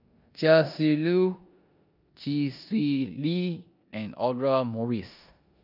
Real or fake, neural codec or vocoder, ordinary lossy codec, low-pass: fake; codec, 16 kHz in and 24 kHz out, 0.9 kbps, LongCat-Audio-Codec, four codebook decoder; none; 5.4 kHz